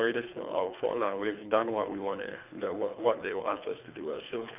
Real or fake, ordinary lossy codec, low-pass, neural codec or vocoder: fake; none; 3.6 kHz; codec, 24 kHz, 3 kbps, HILCodec